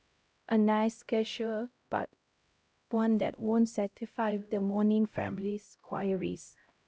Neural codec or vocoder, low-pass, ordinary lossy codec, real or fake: codec, 16 kHz, 0.5 kbps, X-Codec, HuBERT features, trained on LibriSpeech; none; none; fake